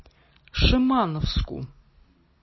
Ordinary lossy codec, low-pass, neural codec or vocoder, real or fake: MP3, 24 kbps; 7.2 kHz; none; real